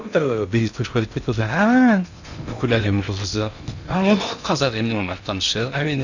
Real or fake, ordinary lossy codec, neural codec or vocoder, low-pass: fake; none; codec, 16 kHz in and 24 kHz out, 0.6 kbps, FocalCodec, streaming, 2048 codes; 7.2 kHz